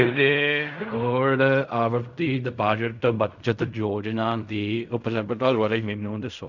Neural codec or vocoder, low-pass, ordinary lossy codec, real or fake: codec, 16 kHz in and 24 kHz out, 0.4 kbps, LongCat-Audio-Codec, fine tuned four codebook decoder; 7.2 kHz; none; fake